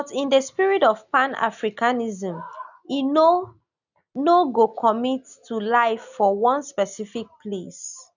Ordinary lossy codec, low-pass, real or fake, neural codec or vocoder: none; 7.2 kHz; real; none